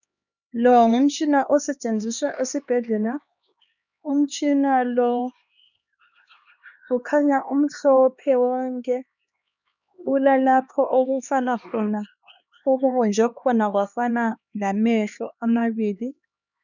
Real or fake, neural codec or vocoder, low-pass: fake; codec, 16 kHz, 2 kbps, X-Codec, HuBERT features, trained on LibriSpeech; 7.2 kHz